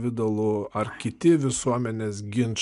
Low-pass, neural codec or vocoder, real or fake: 10.8 kHz; none; real